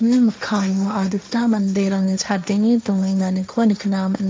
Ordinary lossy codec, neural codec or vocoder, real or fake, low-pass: none; codec, 16 kHz, 1.1 kbps, Voila-Tokenizer; fake; none